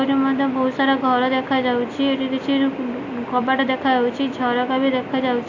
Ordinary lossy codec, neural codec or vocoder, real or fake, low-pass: AAC, 48 kbps; none; real; 7.2 kHz